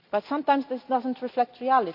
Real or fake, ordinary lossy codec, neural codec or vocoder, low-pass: real; none; none; 5.4 kHz